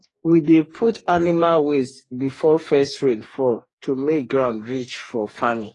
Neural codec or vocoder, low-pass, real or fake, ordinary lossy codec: codec, 44.1 kHz, 2.6 kbps, DAC; 10.8 kHz; fake; AAC, 32 kbps